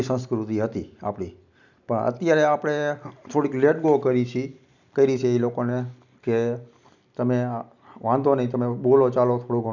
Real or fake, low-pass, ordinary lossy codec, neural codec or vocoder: real; 7.2 kHz; none; none